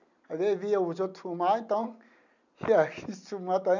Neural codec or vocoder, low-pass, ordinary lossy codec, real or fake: none; 7.2 kHz; none; real